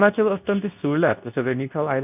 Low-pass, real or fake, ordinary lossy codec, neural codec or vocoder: 3.6 kHz; fake; none; codec, 24 kHz, 0.9 kbps, WavTokenizer, medium speech release version 2